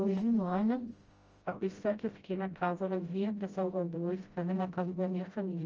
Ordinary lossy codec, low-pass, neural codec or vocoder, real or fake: Opus, 24 kbps; 7.2 kHz; codec, 16 kHz, 0.5 kbps, FreqCodec, smaller model; fake